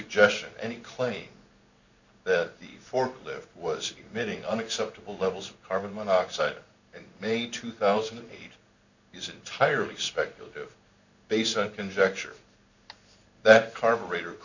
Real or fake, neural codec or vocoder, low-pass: fake; codec, 16 kHz in and 24 kHz out, 1 kbps, XY-Tokenizer; 7.2 kHz